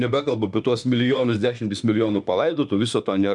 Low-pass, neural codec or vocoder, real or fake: 10.8 kHz; autoencoder, 48 kHz, 32 numbers a frame, DAC-VAE, trained on Japanese speech; fake